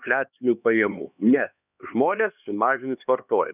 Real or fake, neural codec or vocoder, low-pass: fake; codec, 16 kHz, 2 kbps, X-Codec, HuBERT features, trained on LibriSpeech; 3.6 kHz